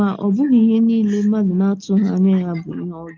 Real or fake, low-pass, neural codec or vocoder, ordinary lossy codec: real; 7.2 kHz; none; Opus, 24 kbps